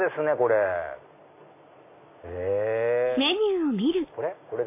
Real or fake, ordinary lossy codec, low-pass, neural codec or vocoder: real; AAC, 24 kbps; 3.6 kHz; none